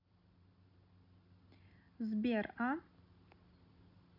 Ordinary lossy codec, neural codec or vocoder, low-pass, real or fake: none; none; 5.4 kHz; real